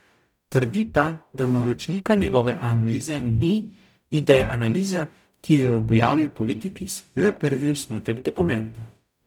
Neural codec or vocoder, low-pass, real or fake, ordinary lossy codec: codec, 44.1 kHz, 0.9 kbps, DAC; 19.8 kHz; fake; none